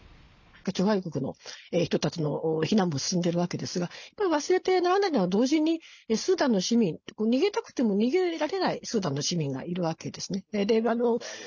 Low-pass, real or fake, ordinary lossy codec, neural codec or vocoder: 7.2 kHz; real; none; none